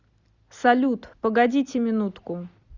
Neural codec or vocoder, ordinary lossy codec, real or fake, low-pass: none; Opus, 64 kbps; real; 7.2 kHz